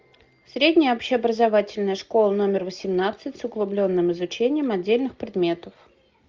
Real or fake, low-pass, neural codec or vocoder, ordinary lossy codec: real; 7.2 kHz; none; Opus, 24 kbps